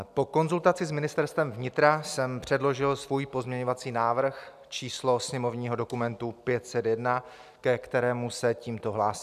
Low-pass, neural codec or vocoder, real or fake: 14.4 kHz; none; real